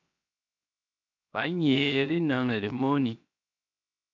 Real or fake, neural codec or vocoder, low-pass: fake; codec, 16 kHz, 0.7 kbps, FocalCodec; 7.2 kHz